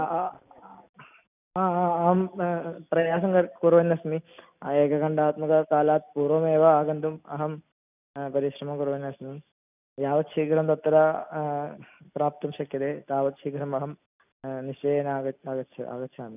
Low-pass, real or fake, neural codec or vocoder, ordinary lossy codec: 3.6 kHz; real; none; none